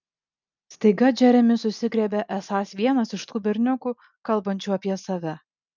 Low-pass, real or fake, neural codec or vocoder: 7.2 kHz; real; none